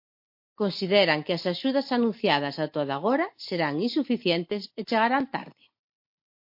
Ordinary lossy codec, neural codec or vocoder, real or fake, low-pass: MP3, 32 kbps; none; real; 5.4 kHz